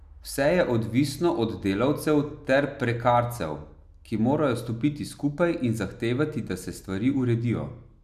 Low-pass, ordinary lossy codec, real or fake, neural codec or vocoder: 14.4 kHz; none; real; none